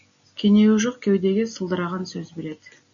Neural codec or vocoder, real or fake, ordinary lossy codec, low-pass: none; real; MP3, 96 kbps; 7.2 kHz